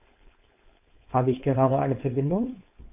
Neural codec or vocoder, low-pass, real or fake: codec, 16 kHz, 4.8 kbps, FACodec; 3.6 kHz; fake